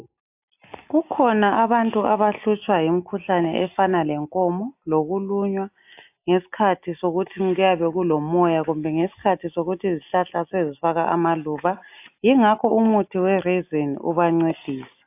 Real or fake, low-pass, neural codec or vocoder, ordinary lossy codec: real; 3.6 kHz; none; AAC, 32 kbps